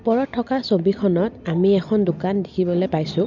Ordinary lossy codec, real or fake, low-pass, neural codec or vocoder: none; real; 7.2 kHz; none